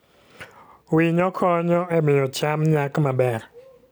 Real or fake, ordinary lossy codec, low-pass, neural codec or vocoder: real; none; none; none